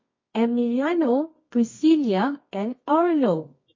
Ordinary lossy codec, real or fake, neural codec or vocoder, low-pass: MP3, 32 kbps; fake; codec, 24 kHz, 0.9 kbps, WavTokenizer, medium music audio release; 7.2 kHz